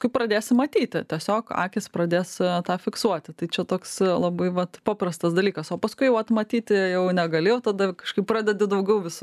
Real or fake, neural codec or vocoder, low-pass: real; none; 14.4 kHz